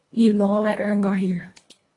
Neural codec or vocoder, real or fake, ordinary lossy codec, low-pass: codec, 24 kHz, 1.5 kbps, HILCodec; fake; AAC, 32 kbps; 10.8 kHz